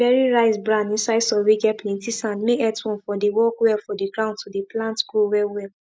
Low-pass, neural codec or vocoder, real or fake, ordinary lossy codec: none; none; real; none